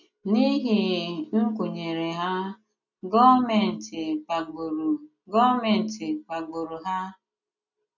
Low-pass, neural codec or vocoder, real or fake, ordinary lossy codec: 7.2 kHz; none; real; none